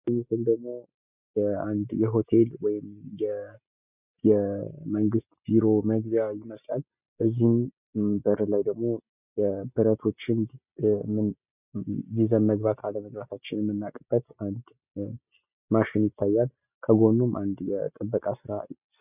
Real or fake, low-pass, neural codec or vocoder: real; 3.6 kHz; none